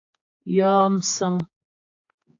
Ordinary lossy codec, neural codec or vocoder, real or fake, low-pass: AAC, 48 kbps; codec, 16 kHz, 4 kbps, X-Codec, HuBERT features, trained on general audio; fake; 7.2 kHz